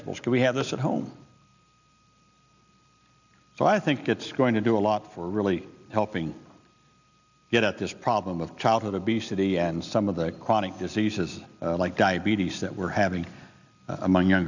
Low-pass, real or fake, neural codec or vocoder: 7.2 kHz; real; none